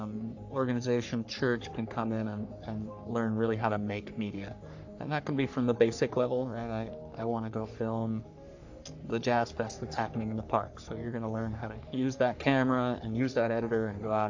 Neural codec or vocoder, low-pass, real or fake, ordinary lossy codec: codec, 44.1 kHz, 3.4 kbps, Pupu-Codec; 7.2 kHz; fake; AAC, 48 kbps